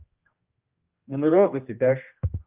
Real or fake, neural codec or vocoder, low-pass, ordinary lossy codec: fake; codec, 16 kHz, 1 kbps, X-Codec, HuBERT features, trained on general audio; 3.6 kHz; Opus, 32 kbps